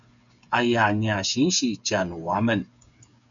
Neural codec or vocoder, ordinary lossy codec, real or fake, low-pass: codec, 16 kHz, 8 kbps, FreqCodec, smaller model; MP3, 96 kbps; fake; 7.2 kHz